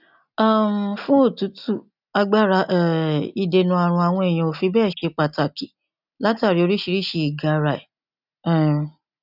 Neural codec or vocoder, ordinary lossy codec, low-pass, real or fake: none; none; 5.4 kHz; real